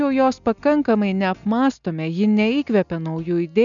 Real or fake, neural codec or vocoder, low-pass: real; none; 7.2 kHz